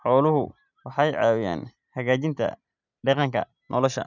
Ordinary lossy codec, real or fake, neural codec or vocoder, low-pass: none; real; none; 7.2 kHz